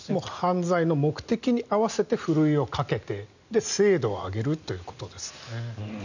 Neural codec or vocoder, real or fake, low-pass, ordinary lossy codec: none; real; 7.2 kHz; none